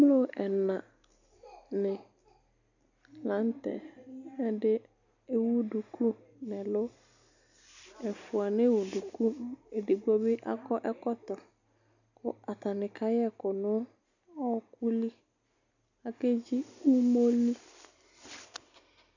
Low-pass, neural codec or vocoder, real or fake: 7.2 kHz; none; real